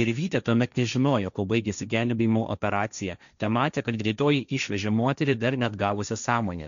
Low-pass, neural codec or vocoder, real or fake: 7.2 kHz; codec, 16 kHz, 1.1 kbps, Voila-Tokenizer; fake